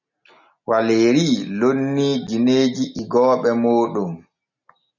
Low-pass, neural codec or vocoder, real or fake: 7.2 kHz; none; real